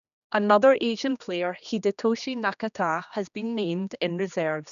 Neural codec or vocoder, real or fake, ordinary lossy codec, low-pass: codec, 16 kHz, 2 kbps, X-Codec, HuBERT features, trained on general audio; fake; none; 7.2 kHz